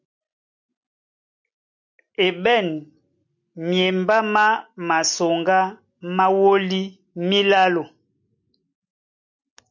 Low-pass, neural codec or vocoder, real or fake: 7.2 kHz; none; real